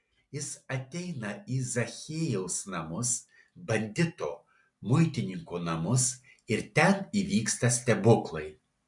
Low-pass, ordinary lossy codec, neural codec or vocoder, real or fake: 10.8 kHz; MP3, 64 kbps; none; real